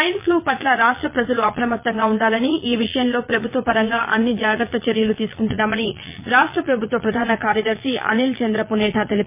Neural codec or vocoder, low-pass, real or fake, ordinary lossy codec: vocoder, 22.05 kHz, 80 mel bands, Vocos; 3.6 kHz; fake; MP3, 24 kbps